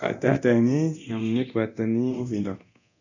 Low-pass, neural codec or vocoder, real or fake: 7.2 kHz; codec, 24 kHz, 0.9 kbps, DualCodec; fake